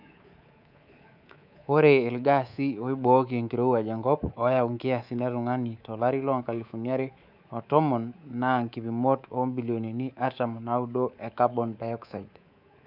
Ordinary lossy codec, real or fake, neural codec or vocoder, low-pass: none; fake; codec, 24 kHz, 3.1 kbps, DualCodec; 5.4 kHz